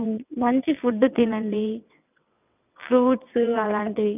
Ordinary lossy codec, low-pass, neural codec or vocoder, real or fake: none; 3.6 kHz; vocoder, 22.05 kHz, 80 mel bands, WaveNeXt; fake